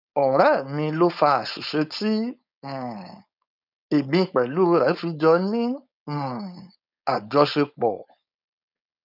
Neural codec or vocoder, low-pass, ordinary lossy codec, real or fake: codec, 16 kHz, 4.8 kbps, FACodec; 5.4 kHz; none; fake